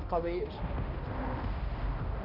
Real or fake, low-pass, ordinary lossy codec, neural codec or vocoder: fake; 5.4 kHz; none; codec, 16 kHz, 1 kbps, X-Codec, HuBERT features, trained on balanced general audio